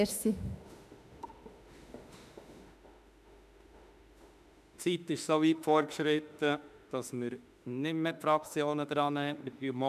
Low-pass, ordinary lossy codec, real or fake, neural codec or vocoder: 14.4 kHz; none; fake; autoencoder, 48 kHz, 32 numbers a frame, DAC-VAE, trained on Japanese speech